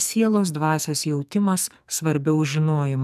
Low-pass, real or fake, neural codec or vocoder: 14.4 kHz; fake; codec, 44.1 kHz, 2.6 kbps, SNAC